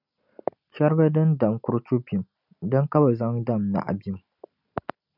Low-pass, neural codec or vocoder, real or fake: 5.4 kHz; none; real